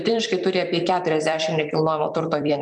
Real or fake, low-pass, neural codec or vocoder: fake; 10.8 kHz; vocoder, 44.1 kHz, 128 mel bands every 256 samples, BigVGAN v2